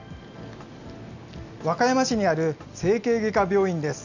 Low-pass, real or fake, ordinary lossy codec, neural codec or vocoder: 7.2 kHz; real; none; none